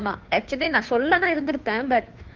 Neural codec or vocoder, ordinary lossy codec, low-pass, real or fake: codec, 16 kHz, 2 kbps, FunCodec, trained on Chinese and English, 25 frames a second; Opus, 32 kbps; 7.2 kHz; fake